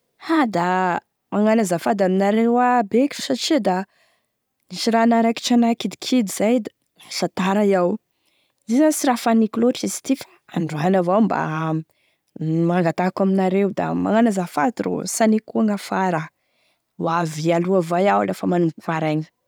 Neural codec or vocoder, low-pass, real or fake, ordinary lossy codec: none; none; real; none